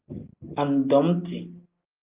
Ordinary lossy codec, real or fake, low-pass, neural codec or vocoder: Opus, 24 kbps; real; 3.6 kHz; none